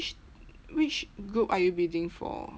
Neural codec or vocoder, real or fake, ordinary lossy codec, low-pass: none; real; none; none